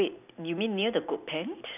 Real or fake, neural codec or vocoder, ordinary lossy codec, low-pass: real; none; none; 3.6 kHz